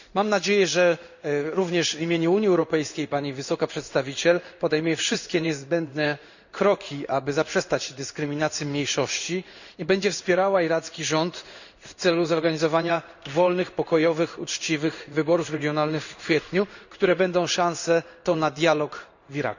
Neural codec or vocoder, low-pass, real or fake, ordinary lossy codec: codec, 16 kHz in and 24 kHz out, 1 kbps, XY-Tokenizer; 7.2 kHz; fake; none